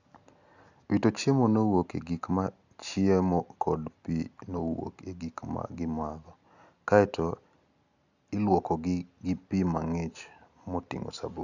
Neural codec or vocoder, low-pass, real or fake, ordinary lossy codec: none; 7.2 kHz; real; none